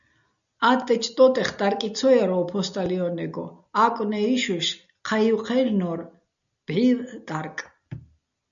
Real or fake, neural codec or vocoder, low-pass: real; none; 7.2 kHz